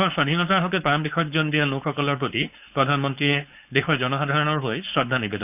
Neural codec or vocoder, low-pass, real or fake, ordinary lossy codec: codec, 16 kHz, 4.8 kbps, FACodec; 3.6 kHz; fake; none